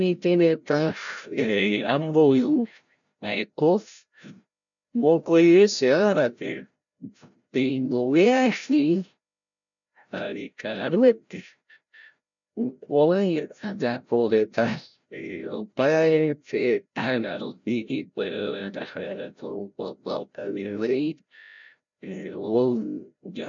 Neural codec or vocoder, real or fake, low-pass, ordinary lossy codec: codec, 16 kHz, 0.5 kbps, FreqCodec, larger model; fake; 7.2 kHz; none